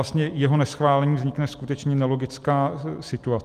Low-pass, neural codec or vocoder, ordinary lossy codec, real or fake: 14.4 kHz; none; Opus, 24 kbps; real